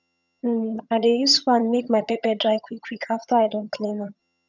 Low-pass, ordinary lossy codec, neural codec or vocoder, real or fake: 7.2 kHz; none; vocoder, 22.05 kHz, 80 mel bands, HiFi-GAN; fake